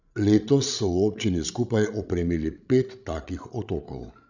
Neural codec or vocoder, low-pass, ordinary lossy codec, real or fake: codec, 16 kHz, 16 kbps, FreqCodec, larger model; 7.2 kHz; none; fake